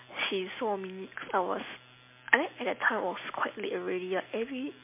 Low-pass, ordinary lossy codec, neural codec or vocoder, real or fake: 3.6 kHz; MP3, 24 kbps; none; real